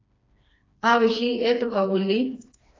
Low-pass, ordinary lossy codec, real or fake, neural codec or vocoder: 7.2 kHz; AAC, 32 kbps; fake; codec, 16 kHz, 2 kbps, FreqCodec, smaller model